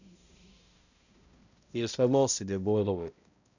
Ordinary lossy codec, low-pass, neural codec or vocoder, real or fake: none; 7.2 kHz; codec, 16 kHz, 0.5 kbps, X-Codec, HuBERT features, trained on balanced general audio; fake